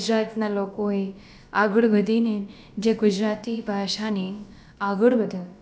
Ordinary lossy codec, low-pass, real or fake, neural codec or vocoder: none; none; fake; codec, 16 kHz, about 1 kbps, DyCAST, with the encoder's durations